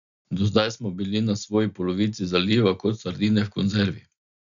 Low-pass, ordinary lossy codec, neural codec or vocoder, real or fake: 7.2 kHz; none; none; real